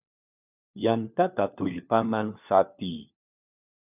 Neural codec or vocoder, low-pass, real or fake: codec, 16 kHz, 4 kbps, FunCodec, trained on LibriTTS, 50 frames a second; 3.6 kHz; fake